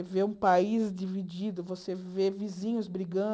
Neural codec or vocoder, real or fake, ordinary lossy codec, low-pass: none; real; none; none